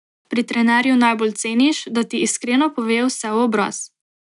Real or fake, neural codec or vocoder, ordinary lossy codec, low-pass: real; none; none; 10.8 kHz